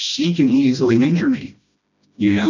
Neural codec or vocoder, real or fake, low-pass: codec, 16 kHz, 1 kbps, FreqCodec, smaller model; fake; 7.2 kHz